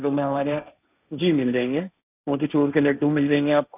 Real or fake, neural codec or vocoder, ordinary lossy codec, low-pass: fake; codec, 16 kHz, 1.1 kbps, Voila-Tokenizer; none; 3.6 kHz